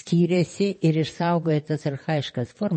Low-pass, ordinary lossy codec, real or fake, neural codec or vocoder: 9.9 kHz; MP3, 32 kbps; fake; vocoder, 22.05 kHz, 80 mel bands, WaveNeXt